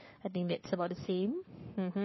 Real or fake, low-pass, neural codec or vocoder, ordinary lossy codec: fake; 7.2 kHz; codec, 44.1 kHz, 7.8 kbps, Pupu-Codec; MP3, 24 kbps